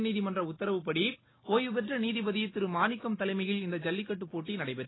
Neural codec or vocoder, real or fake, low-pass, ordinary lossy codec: none; real; 7.2 kHz; AAC, 16 kbps